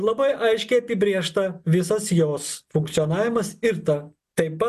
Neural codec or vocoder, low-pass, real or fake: none; 14.4 kHz; real